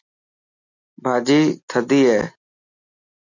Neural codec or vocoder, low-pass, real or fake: none; 7.2 kHz; real